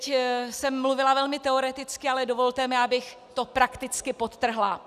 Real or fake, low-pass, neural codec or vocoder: real; 14.4 kHz; none